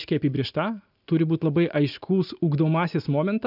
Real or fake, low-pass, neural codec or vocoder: real; 5.4 kHz; none